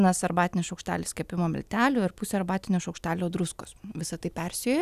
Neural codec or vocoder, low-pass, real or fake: none; 14.4 kHz; real